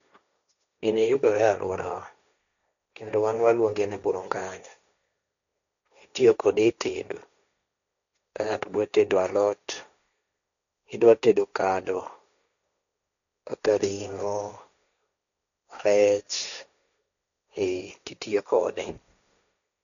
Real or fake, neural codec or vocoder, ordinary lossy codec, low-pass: fake; codec, 16 kHz, 1.1 kbps, Voila-Tokenizer; none; 7.2 kHz